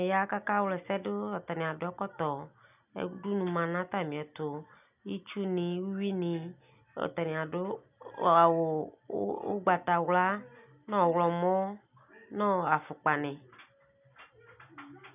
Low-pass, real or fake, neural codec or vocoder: 3.6 kHz; real; none